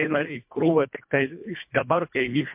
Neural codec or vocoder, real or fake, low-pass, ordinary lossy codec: codec, 24 kHz, 1.5 kbps, HILCodec; fake; 3.6 kHz; MP3, 32 kbps